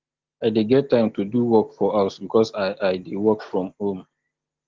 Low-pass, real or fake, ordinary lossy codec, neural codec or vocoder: 7.2 kHz; real; Opus, 16 kbps; none